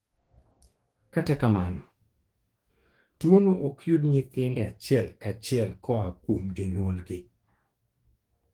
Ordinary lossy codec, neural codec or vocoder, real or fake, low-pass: Opus, 24 kbps; codec, 44.1 kHz, 2.6 kbps, DAC; fake; 19.8 kHz